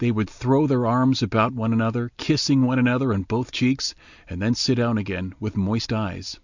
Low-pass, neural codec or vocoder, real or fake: 7.2 kHz; none; real